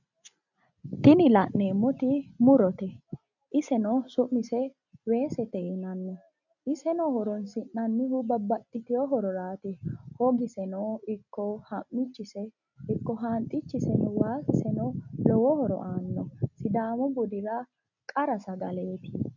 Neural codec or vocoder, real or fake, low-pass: none; real; 7.2 kHz